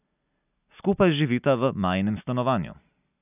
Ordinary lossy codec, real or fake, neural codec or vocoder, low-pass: none; real; none; 3.6 kHz